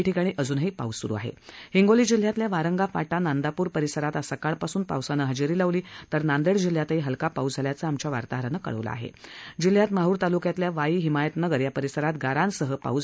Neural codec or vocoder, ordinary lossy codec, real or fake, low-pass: none; none; real; none